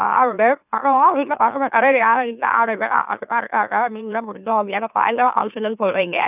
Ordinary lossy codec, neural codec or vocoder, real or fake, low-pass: none; autoencoder, 44.1 kHz, a latent of 192 numbers a frame, MeloTTS; fake; 3.6 kHz